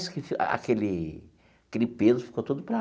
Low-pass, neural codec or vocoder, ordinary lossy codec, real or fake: none; none; none; real